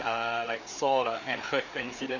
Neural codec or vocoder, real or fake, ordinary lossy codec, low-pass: codec, 16 kHz, 4 kbps, FreqCodec, larger model; fake; none; 7.2 kHz